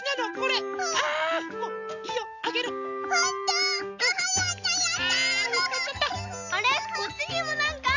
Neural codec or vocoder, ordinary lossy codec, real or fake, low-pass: none; none; real; 7.2 kHz